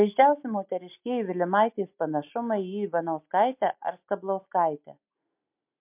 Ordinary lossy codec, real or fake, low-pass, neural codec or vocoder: MP3, 32 kbps; real; 3.6 kHz; none